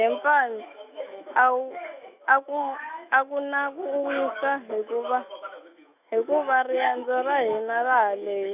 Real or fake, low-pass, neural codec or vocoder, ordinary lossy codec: fake; 3.6 kHz; autoencoder, 48 kHz, 128 numbers a frame, DAC-VAE, trained on Japanese speech; none